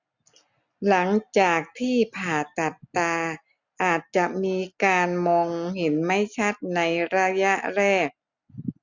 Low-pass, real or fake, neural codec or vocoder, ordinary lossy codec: 7.2 kHz; real; none; none